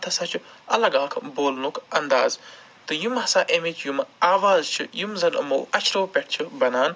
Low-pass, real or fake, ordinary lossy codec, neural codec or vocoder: none; real; none; none